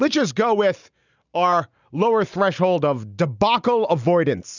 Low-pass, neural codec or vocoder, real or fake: 7.2 kHz; none; real